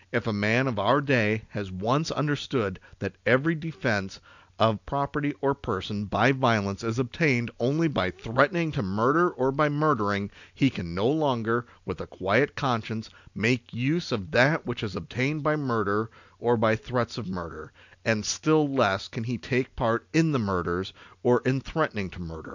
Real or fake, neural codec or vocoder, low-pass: real; none; 7.2 kHz